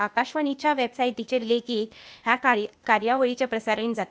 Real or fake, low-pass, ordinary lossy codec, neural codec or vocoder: fake; none; none; codec, 16 kHz, 0.8 kbps, ZipCodec